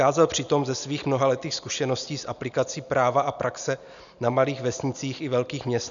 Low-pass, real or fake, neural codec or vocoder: 7.2 kHz; real; none